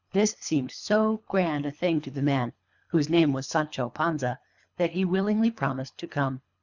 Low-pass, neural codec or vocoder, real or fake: 7.2 kHz; codec, 24 kHz, 3 kbps, HILCodec; fake